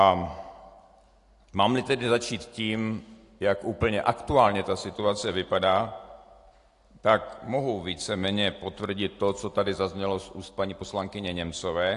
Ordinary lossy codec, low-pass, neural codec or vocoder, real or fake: AAC, 48 kbps; 10.8 kHz; none; real